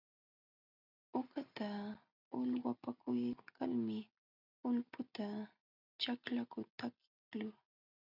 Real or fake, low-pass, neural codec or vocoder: real; 5.4 kHz; none